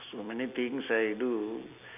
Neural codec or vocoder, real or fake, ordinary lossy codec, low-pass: none; real; none; 3.6 kHz